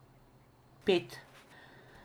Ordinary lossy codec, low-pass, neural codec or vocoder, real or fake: none; none; none; real